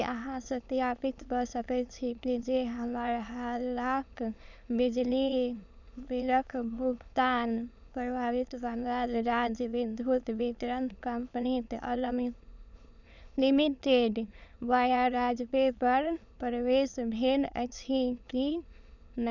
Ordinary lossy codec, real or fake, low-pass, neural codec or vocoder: none; fake; 7.2 kHz; autoencoder, 22.05 kHz, a latent of 192 numbers a frame, VITS, trained on many speakers